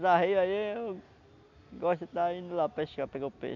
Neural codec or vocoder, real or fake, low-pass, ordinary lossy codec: none; real; 7.2 kHz; none